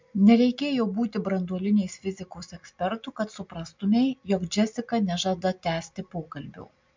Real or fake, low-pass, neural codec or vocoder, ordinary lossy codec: real; 7.2 kHz; none; MP3, 64 kbps